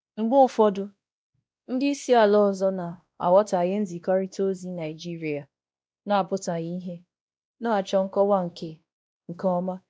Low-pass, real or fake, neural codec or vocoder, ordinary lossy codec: none; fake; codec, 16 kHz, 1 kbps, X-Codec, WavLM features, trained on Multilingual LibriSpeech; none